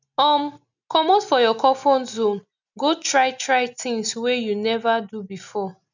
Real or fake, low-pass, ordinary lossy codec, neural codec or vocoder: real; 7.2 kHz; none; none